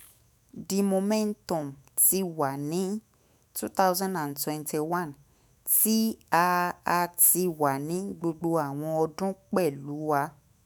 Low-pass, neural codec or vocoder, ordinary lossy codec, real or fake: none; autoencoder, 48 kHz, 128 numbers a frame, DAC-VAE, trained on Japanese speech; none; fake